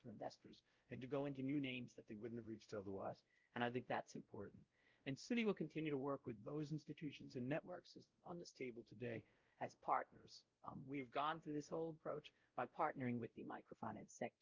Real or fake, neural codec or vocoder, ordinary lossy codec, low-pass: fake; codec, 16 kHz, 0.5 kbps, X-Codec, WavLM features, trained on Multilingual LibriSpeech; Opus, 32 kbps; 7.2 kHz